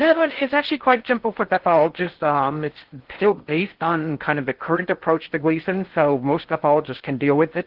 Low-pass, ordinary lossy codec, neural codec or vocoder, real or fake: 5.4 kHz; Opus, 16 kbps; codec, 16 kHz in and 24 kHz out, 0.6 kbps, FocalCodec, streaming, 2048 codes; fake